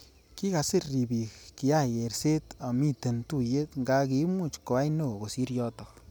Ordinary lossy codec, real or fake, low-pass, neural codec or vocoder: none; real; none; none